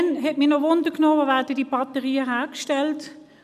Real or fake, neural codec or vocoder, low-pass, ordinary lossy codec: real; none; 14.4 kHz; none